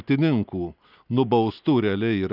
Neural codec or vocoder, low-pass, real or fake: none; 5.4 kHz; real